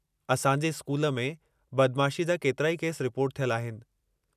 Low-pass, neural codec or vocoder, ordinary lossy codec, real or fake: 14.4 kHz; none; none; real